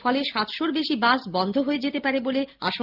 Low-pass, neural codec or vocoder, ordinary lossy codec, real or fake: 5.4 kHz; none; Opus, 32 kbps; real